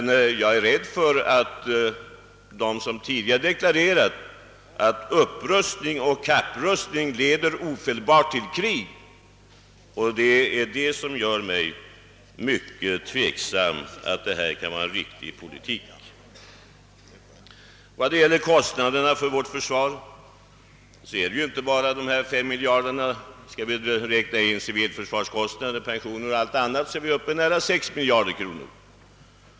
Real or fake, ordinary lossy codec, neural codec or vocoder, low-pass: real; none; none; none